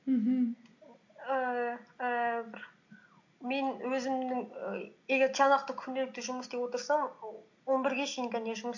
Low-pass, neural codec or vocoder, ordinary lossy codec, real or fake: 7.2 kHz; none; MP3, 48 kbps; real